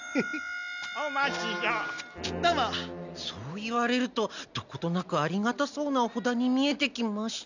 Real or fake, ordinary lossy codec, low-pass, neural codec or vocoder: real; none; 7.2 kHz; none